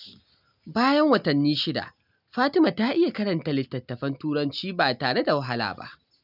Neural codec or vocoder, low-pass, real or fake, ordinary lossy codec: none; 5.4 kHz; real; none